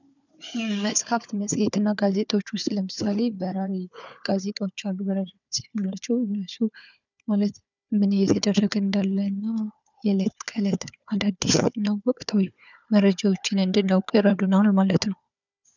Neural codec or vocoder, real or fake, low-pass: codec, 16 kHz, 4 kbps, FunCodec, trained on Chinese and English, 50 frames a second; fake; 7.2 kHz